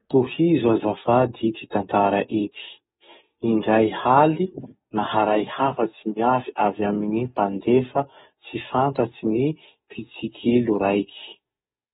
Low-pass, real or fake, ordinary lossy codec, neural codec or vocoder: 19.8 kHz; fake; AAC, 16 kbps; codec, 44.1 kHz, 7.8 kbps, DAC